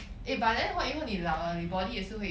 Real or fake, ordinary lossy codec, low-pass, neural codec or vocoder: real; none; none; none